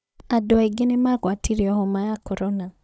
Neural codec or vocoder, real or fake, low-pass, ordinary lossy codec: codec, 16 kHz, 16 kbps, FunCodec, trained on Chinese and English, 50 frames a second; fake; none; none